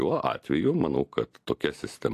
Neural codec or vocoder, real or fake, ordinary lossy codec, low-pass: none; real; AAC, 64 kbps; 14.4 kHz